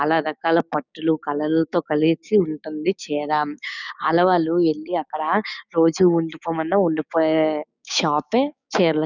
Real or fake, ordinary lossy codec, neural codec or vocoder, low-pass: fake; none; codec, 16 kHz, 6 kbps, DAC; 7.2 kHz